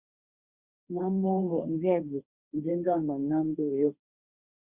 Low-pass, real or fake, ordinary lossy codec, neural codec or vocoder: 3.6 kHz; fake; Opus, 64 kbps; codec, 16 kHz, 1.1 kbps, Voila-Tokenizer